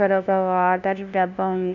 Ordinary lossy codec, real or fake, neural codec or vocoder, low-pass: none; fake; codec, 16 kHz, 0.5 kbps, FunCodec, trained on LibriTTS, 25 frames a second; 7.2 kHz